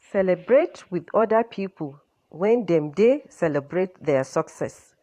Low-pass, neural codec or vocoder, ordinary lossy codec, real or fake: 14.4 kHz; vocoder, 44.1 kHz, 128 mel bands every 512 samples, BigVGAN v2; AAC, 64 kbps; fake